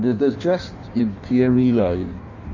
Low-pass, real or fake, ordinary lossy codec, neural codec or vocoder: 7.2 kHz; fake; none; codec, 16 kHz in and 24 kHz out, 1.1 kbps, FireRedTTS-2 codec